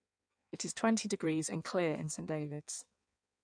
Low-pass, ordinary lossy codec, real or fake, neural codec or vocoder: 9.9 kHz; none; fake; codec, 16 kHz in and 24 kHz out, 1.1 kbps, FireRedTTS-2 codec